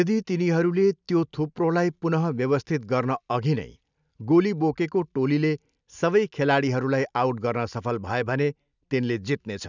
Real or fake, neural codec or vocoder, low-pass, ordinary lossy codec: real; none; 7.2 kHz; none